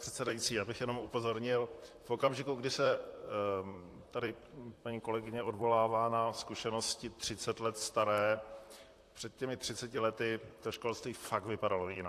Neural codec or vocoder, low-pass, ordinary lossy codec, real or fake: vocoder, 44.1 kHz, 128 mel bands, Pupu-Vocoder; 14.4 kHz; AAC, 64 kbps; fake